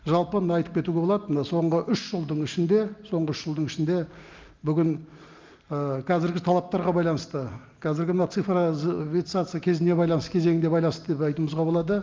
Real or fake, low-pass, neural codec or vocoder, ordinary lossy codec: real; 7.2 kHz; none; Opus, 24 kbps